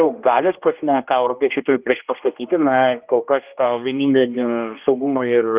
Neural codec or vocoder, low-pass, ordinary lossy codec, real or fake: codec, 16 kHz, 1 kbps, X-Codec, HuBERT features, trained on general audio; 3.6 kHz; Opus, 32 kbps; fake